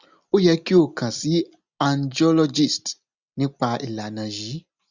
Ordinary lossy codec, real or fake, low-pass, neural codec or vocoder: Opus, 64 kbps; real; 7.2 kHz; none